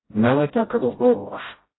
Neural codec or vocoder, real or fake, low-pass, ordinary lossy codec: codec, 16 kHz, 0.5 kbps, FreqCodec, smaller model; fake; 7.2 kHz; AAC, 16 kbps